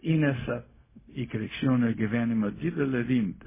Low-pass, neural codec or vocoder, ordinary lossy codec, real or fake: 3.6 kHz; codec, 16 kHz, 0.4 kbps, LongCat-Audio-Codec; MP3, 16 kbps; fake